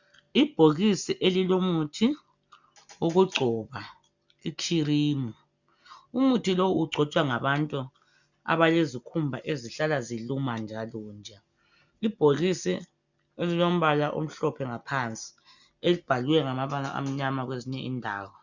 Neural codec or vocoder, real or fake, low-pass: none; real; 7.2 kHz